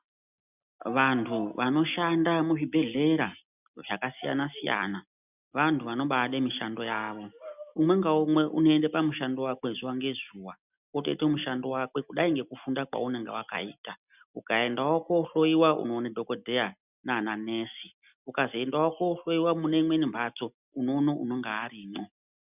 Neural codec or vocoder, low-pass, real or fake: none; 3.6 kHz; real